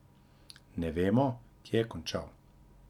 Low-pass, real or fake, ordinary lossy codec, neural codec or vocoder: 19.8 kHz; real; none; none